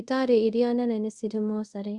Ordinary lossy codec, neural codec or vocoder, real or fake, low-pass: Opus, 64 kbps; codec, 24 kHz, 0.5 kbps, DualCodec; fake; 10.8 kHz